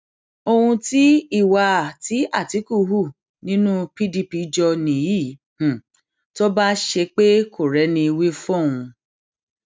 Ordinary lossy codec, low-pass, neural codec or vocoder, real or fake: none; none; none; real